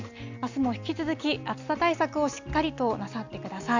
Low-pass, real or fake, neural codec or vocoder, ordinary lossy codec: 7.2 kHz; real; none; Opus, 64 kbps